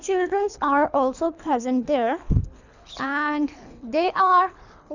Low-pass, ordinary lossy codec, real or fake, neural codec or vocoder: 7.2 kHz; none; fake; codec, 24 kHz, 3 kbps, HILCodec